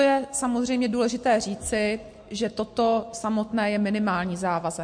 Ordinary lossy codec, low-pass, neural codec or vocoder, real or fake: MP3, 48 kbps; 9.9 kHz; none; real